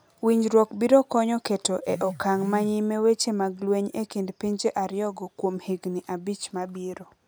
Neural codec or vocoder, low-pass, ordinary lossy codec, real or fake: none; none; none; real